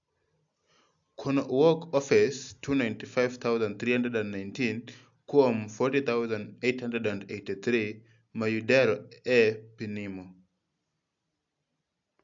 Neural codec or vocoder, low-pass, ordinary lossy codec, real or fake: none; 7.2 kHz; AAC, 64 kbps; real